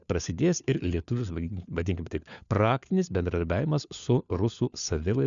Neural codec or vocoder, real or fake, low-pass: codec, 16 kHz, 4 kbps, FunCodec, trained on LibriTTS, 50 frames a second; fake; 7.2 kHz